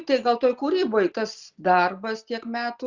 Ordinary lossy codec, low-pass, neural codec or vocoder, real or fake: Opus, 64 kbps; 7.2 kHz; none; real